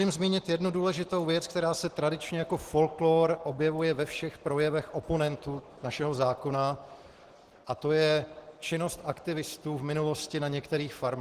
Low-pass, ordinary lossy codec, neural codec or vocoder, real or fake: 14.4 kHz; Opus, 16 kbps; none; real